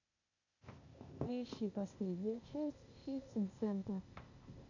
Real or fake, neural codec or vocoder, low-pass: fake; codec, 16 kHz, 0.8 kbps, ZipCodec; 7.2 kHz